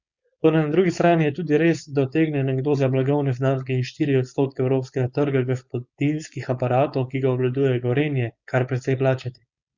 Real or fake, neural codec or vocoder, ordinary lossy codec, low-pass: fake; codec, 16 kHz, 4.8 kbps, FACodec; Opus, 64 kbps; 7.2 kHz